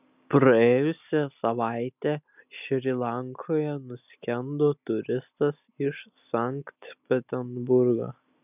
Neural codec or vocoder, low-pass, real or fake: none; 3.6 kHz; real